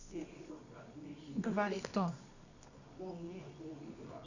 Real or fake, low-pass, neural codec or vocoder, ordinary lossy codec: fake; 7.2 kHz; codec, 24 kHz, 0.9 kbps, WavTokenizer, medium music audio release; none